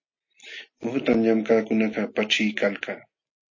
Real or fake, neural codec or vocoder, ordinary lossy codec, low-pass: real; none; MP3, 32 kbps; 7.2 kHz